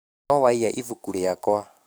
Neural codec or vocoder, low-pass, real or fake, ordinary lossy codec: codec, 44.1 kHz, 7.8 kbps, DAC; none; fake; none